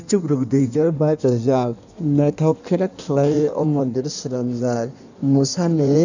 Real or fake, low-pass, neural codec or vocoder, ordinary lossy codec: fake; 7.2 kHz; codec, 16 kHz in and 24 kHz out, 1.1 kbps, FireRedTTS-2 codec; none